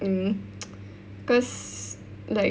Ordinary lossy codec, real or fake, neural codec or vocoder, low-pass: none; real; none; none